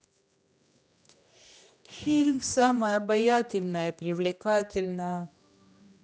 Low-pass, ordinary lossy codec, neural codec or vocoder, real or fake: none; none; codec, 16 kHz, 1 kbps, X-Codec, HuBERT features, trained on general audio; fake